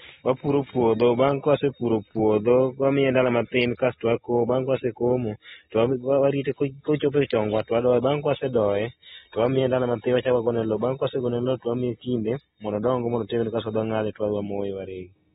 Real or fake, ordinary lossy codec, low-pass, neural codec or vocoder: real; AAC, 16 kbps; 7.2 kHz; none